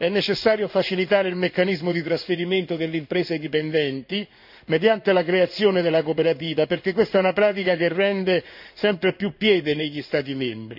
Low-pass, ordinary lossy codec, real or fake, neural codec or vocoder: 5.4 kHz; none; fake; codec, 16 kHz in and 24 kHz out, 1 kbps, XY-Tokenizer